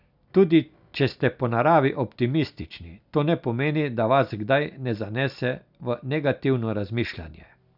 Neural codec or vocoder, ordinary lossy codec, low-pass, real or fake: none; none; 5.4 kHz; real